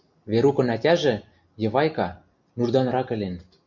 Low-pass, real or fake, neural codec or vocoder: 7.2 kHz; real; none